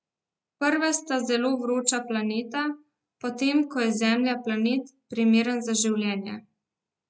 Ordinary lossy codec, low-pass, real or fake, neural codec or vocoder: none; none; real; none